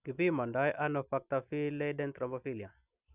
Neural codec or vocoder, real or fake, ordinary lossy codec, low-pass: none; real; none; 3.6 kHz